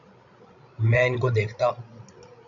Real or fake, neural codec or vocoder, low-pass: fake; codec, 16 kHz, 16 kbps, FreqCodec, larger model; 7.2 kHz